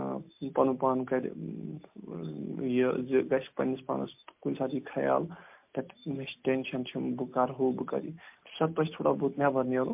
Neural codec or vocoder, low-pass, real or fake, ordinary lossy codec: none; 3.6 kHz; real; MP3, 32 kbps